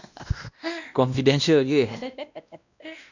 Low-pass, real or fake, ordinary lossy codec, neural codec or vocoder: 7.2 kHz; fake; none; codec, 16 kHz, 1 kbps, X-Codec, WavLM features, trained on Multilingual LibriSpeech